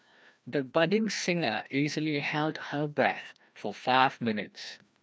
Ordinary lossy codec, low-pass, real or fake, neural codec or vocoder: none; none; fake; codec, 16 kHz, 1 kbps, FreqCodec, larger model